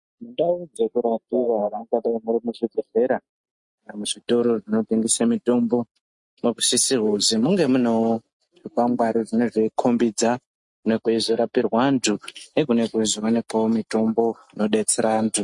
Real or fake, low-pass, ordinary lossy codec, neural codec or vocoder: real; 10.8 kHz; MP3, 48 kbps; none